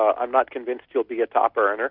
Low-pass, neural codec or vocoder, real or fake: 5.4 kHz; none; real